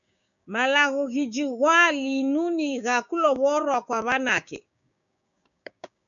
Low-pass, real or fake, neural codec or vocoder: 7.2 kHz; fake; codec, 16 kHz, 6 kbps, DAC